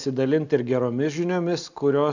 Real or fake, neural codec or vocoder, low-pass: real; none; 7.2 kHz